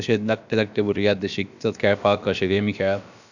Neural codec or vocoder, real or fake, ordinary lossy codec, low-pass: codec, 16 kHz, about 1 kbps, DyCAST, with the encoder's durations; fake; none; 7.2 kHz